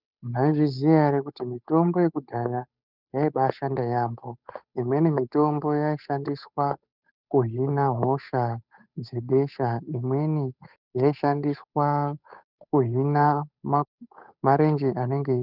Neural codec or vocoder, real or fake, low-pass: codec, 16 kHz, 8 kbps, FunCodec, trained on Chinese and English, 25 frames a second; fake; 5.4 kHz